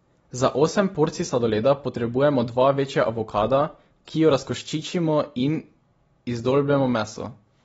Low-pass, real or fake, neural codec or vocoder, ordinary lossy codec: 19.8 kHz; real; none; AAC, 24 kbps